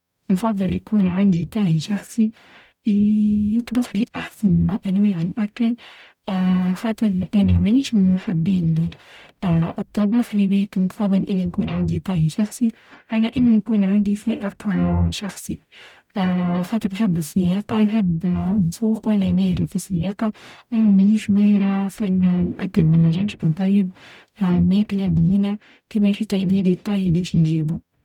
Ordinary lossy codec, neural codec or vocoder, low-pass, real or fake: none; codec, 44.1 kHz, 0.9 kbps, DAC; 19.8 kHz; fake